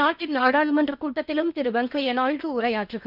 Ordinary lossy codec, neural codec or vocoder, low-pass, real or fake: none; codec, 16 kHz in and 24 kHz out, 0.8 kbps, FocalCodec, streaming, 65536 codes; 5.4 kHz; fake